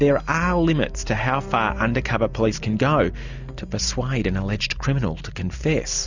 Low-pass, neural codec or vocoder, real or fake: 7.2 kHz; none; real